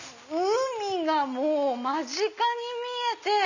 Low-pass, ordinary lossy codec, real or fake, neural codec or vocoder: 7.2 kHz; none; real; none